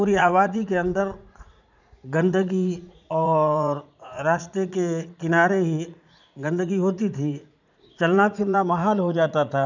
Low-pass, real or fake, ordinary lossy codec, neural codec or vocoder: 7.2 kHz; fake; none; vocoder, 44.1 kHz, 80 mel bands, Vocos